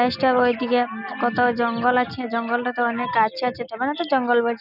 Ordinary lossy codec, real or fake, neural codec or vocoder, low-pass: none; real; none; 5.4 kHz